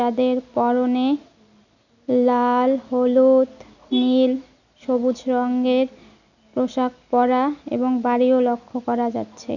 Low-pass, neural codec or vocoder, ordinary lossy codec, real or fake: 7.2 kHz; none; none; real